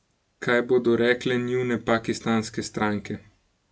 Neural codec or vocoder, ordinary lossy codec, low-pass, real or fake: none; none; none; real